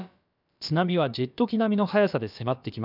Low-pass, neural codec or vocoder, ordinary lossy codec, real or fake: 5.4 kHz; codec, 16 kHz, about 1 kbps, DyCAST, with the encoder's durations; none; fake